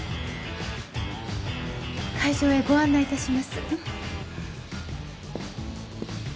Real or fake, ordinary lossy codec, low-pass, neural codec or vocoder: real; none; none; none